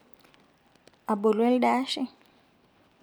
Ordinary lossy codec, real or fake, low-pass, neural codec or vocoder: none; real; none; none